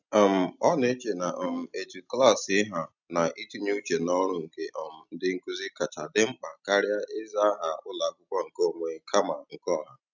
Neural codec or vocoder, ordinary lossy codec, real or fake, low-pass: none; none; real; 7.2 kHz